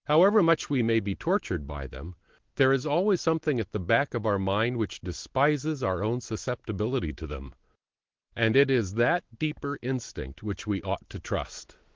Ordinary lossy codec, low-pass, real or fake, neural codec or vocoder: Opus, 16 kbps; 7.2 kHz; real; none